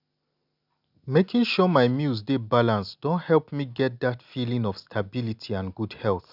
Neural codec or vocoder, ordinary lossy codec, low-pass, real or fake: none; none; 5.4 kHz; real